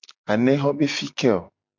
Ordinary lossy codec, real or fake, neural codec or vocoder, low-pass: MP3, 64 kbps; real; none; 7.2 kHz